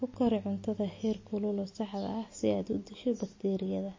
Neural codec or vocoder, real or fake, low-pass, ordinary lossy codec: none; real; 7.2 kHz; MP3, 32 kbps